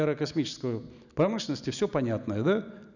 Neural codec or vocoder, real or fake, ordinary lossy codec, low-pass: none; real; none; 7.2 kHz